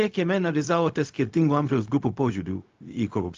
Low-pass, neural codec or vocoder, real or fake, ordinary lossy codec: 7.2 kHz; codec, 16 kHz, 0.4 kbps, LongCat-Audio-Codec; fake; Opus, 32 kbps